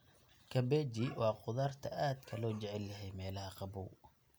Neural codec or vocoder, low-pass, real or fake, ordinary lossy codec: none; none; real; none